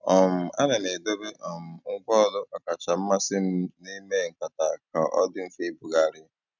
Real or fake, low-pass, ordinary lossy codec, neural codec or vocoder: real; 7.2 kHz; none; none